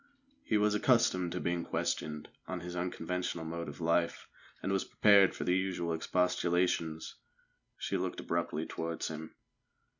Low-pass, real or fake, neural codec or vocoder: 7.2 kHz; real; none